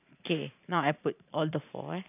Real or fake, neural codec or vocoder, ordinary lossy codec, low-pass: fake; vocoder, 44.1 kHz, 128 mel bands every 512 samples, BigVGAN v2; none; 3.6 kHz